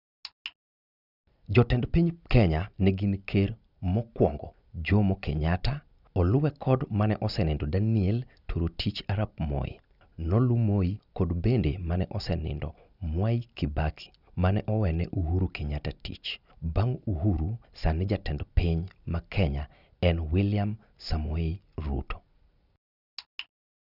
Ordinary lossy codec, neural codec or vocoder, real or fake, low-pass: none; none; real; 5.4 kHz